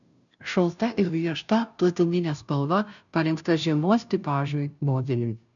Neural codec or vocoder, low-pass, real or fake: codec, 16 kHz, 0.5 kbps, FunCodec, trained on Chinese and English, 25 frames a second; 7.2 kHz; fake